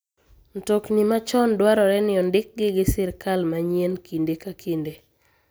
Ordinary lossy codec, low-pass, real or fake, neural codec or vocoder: none; none; real; none